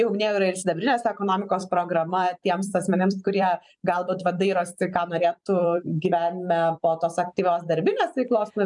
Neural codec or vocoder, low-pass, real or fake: vocoder, 44.1 kHz, 128 mel bands every 256 samples, BigVGAN v2; 10.8 kHz; fake